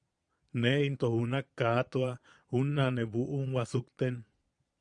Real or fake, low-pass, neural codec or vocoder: fake; 9.9 kHz; vocoder, 22.05 kHz, 80 mel bands, Vocos